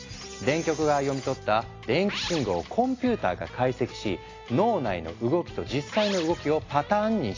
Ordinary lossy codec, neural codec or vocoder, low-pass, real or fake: AAC, 32 kbps; none; 7.2 kHz; real